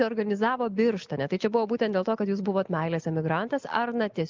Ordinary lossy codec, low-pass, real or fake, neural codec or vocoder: Opus, 32 kbps; 7.2 kHz; real; none